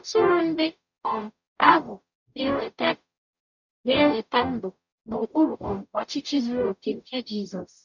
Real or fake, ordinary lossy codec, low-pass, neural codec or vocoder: fake; none; 7.2 kHz; codec, 44.1 kHz, 0.9 kbps, DAC